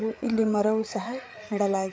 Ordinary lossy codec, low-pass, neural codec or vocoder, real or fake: none; none; codec, 16 kHz, 8 kbps, FreqCodec, larger model; fake